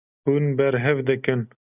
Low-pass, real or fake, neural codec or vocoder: 3.6 kHz; real; none